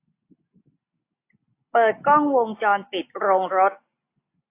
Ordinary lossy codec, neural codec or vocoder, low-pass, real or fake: AAC, 24 kbps; none; 3.6 kHz; real